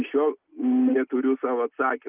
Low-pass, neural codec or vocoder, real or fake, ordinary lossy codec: 3.6 kHz; none; real; Opus, 32 kbps